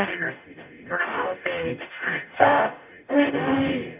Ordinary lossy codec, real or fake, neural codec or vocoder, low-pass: none; fake; codec, 44.1 kHz, 0.9 kbps, DAC; 3.6 kHz